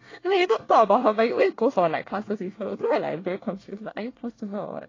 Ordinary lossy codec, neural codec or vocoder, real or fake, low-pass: AAC, 32 kbps; codec, 24 kHz, 1 kbps, SNAC; fake; 7.2 kHz